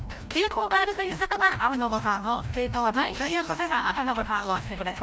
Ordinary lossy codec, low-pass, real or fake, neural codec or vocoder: none; none; fake; codec, 16 kHz, 0.5 kbps, FreqCodec, larger model